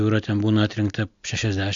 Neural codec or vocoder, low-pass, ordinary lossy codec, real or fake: none; 7.2 kHz; AAC, 64 kbps; real